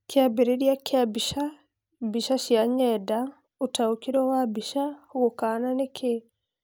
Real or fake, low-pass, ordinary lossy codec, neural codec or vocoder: real; none; none; none